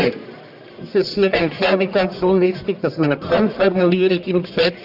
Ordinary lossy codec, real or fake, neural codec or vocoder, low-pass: AAC, 48 kbps; fake; codec, 44.1 kHz, 1.7 kbps, Pupu-Codec; 5.4 kHz